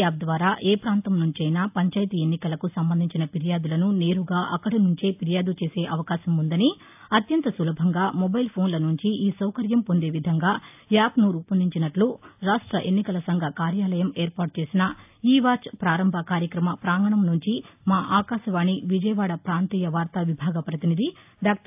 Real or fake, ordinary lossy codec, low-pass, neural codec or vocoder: real; AAC, 32 kbps; 3.6 kHz; none